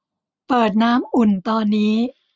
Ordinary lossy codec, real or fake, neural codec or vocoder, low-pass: none; real; none; none